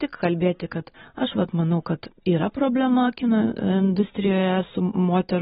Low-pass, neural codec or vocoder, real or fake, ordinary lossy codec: 14.4 kHz; none; real; AAC, 16 kbps